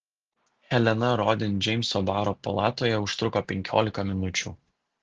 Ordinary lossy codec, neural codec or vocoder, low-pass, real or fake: Opus, 16 kbps; none; 7.2 kHz; real